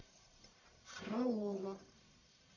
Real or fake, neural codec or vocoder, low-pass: fake; codec, 44.1 kHz, 1.7 kbps, Pupu-Codec; 7.2 kHz